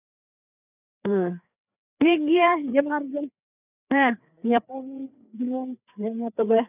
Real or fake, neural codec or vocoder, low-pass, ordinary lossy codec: fake; codec, 16 kHz, 4 kbps, FreqCodec, larger model; 3.6 kHz; none